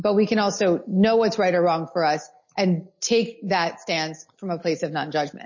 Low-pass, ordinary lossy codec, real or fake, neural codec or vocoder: 7.2 kHz; MP3, 32 kbps; real; none